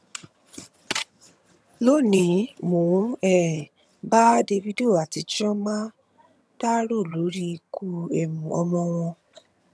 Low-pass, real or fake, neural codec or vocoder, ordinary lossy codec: none; fake; vocoder, 22.05 kHz, 80 mel bands, HiFi-GAN; none